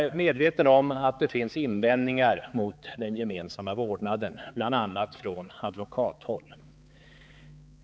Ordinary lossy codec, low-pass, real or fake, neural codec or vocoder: none; none; fake; codec, 16 kHz, 4 kbps, X-Codec, HuBERT features, trained on balanced general audio